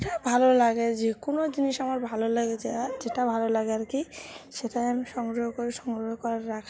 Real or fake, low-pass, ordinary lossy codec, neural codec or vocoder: real; none; none; none